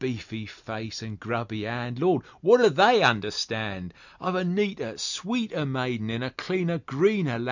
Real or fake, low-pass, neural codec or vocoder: real; 7.2 kHz; none